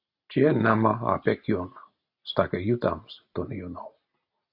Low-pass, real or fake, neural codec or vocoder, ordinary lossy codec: 5.4 kHz; real; none; AAC, 48 kbps